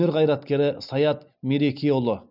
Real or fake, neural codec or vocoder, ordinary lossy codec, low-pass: real; none; none; 5.4 kHz